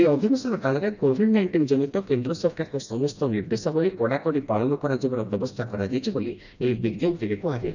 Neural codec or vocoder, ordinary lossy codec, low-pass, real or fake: codec, 16 kHz, 1 kbps, FreqCodec, smaller model; none; 7.2 kHz; fake